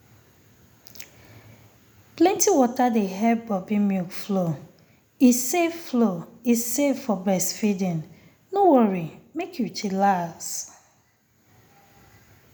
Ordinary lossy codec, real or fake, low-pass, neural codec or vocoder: none; real; none; none